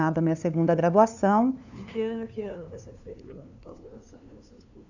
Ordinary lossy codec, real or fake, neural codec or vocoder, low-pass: none; fake; codec, 16 kHz, 2 kbps, FunCodec, trained on LibriTTS, 25 frames a second; 7.2 kHz